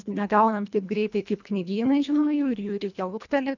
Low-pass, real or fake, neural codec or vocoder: 7.2 kHz; fake; codec, 24 kHz, 1.5 kbps, HILCodec